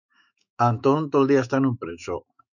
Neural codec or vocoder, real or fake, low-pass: autoencoder, 48 kHz, 128 numbers a frame, DAC-VAE, trained on Japanese speech; fake; 7.2 kHz